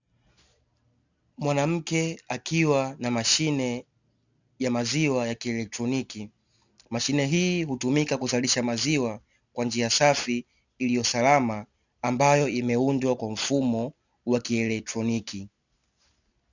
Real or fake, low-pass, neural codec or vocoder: real; 7.2 kHz; none